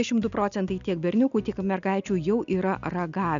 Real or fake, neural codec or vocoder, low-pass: real; none; 7.2 kHz